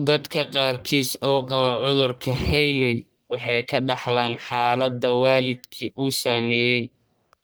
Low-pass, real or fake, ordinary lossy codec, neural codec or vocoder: none; fake; none; codec, 44.1 kHz, 1.7 kbps, Pupu-Codec